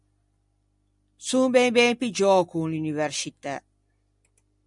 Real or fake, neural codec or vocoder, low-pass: real; none; 10.8 kHz